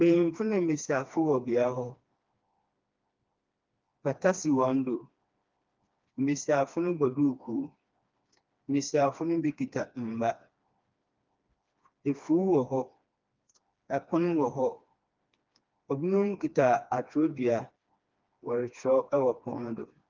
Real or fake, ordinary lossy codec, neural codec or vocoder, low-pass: fake; Opus, 32 kbps; codec, 16 kHz, 2 kbps, FreqCodec, smaller model; 7.2 kHz